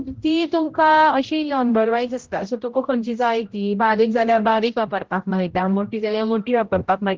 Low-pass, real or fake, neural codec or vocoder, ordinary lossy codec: 7.2 kHz; fake; codec, 16 kHz, 0.5 kbps, X-Codec, HuBERT features, trained on general audio; Opus, 16 kbps